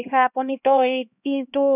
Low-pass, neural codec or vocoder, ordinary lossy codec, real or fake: 3.6 kHz; codec, 16 kHz, 1 kbps, X-Codec, HuBERT features, trained on LibriSpeech; none; fake